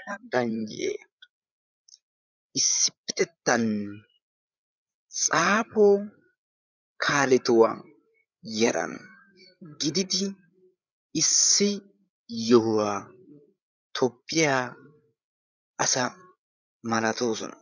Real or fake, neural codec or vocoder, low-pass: fake; codec, 16 kHz, 8 kbps, FreqCodec, larger model; 7.2 kHz